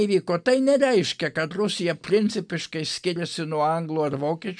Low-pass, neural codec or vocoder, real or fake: 9.9 kHz; none; real